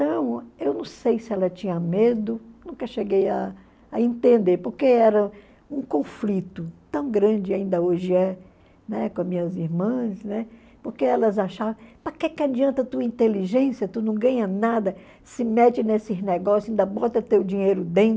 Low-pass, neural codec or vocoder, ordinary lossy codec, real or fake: none; none; none; real